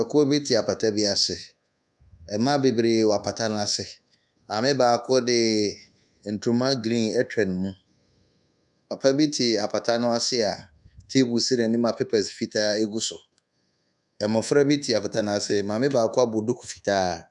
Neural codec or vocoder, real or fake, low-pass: codec, 24 kHz, 1.2 kbps, DualCodec; fake; 10.8 kHz